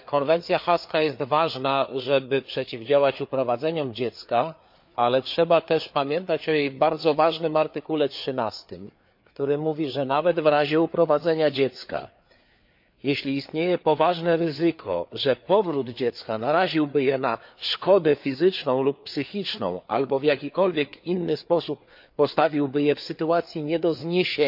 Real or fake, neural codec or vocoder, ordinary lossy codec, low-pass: fake; codec, 16 kHz, 4 kbps, FreqCodec, larger model; MP3, 48 kbps; 5.4 kHz